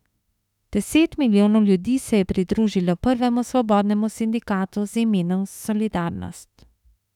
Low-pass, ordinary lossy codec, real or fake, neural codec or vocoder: 19.8 kHz; none; fake; autoencoder, 48 kHz, 32 numbers a frame, DAC-VAE, trained on Japanese speech